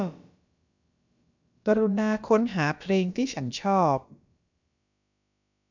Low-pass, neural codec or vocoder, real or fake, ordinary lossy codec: 7.2 kHz; codec, 16 kHz, about 1 kbps, DyCAST, with the encoder's durations; fake; none